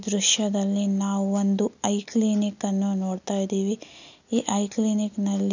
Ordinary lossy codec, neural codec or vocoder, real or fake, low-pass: none; none; real; 7.2 kHz